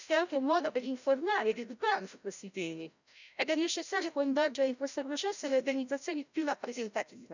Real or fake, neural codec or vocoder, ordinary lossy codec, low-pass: fake; codec, 16 kHz, 0.5 kbps, FreqCodec, larger model; none; 7.2 kHz